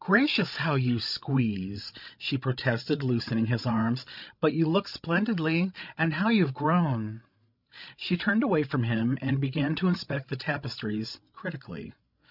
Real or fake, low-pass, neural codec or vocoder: fake; 5.4 kHz; codec, 16 kHz, 16 kbps, FreqCodec, larger model